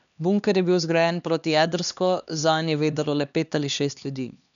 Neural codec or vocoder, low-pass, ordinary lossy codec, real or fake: codec, 16 kHz, 2 kbps, X-Codec, HuBERT features, trained on LibriSpeech; 7.2 kHz; none; fake